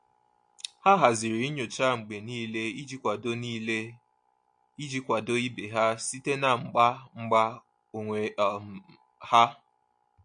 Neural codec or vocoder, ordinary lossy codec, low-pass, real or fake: none; MP3, 48 kbps; 9.9 kHz; real